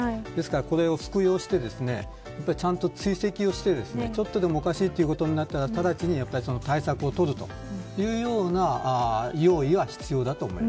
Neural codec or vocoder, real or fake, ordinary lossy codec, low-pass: none; real; none; none